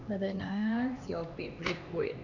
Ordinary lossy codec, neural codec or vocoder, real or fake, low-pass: none; codec, 16 kHz, 2 kbps, X-Codec, HuBERT features, trained on LibriSpeech; fake; 7.2 kHz